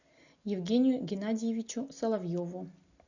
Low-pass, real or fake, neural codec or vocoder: 7.2 kHz; real; none